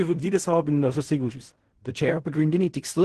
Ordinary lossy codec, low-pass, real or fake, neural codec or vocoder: Opus, 16 kbps; 10.8 kHz; fake; codec, 16 kHz in and 24 kHz out, 0.4 kbps, LongCat-Audio-Codec, fine tuned four codebook decoder